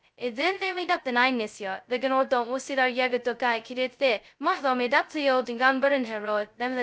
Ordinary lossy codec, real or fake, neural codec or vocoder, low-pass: none; fake; codec, 16 kHz, 0.2 kbps, FocalCodec; none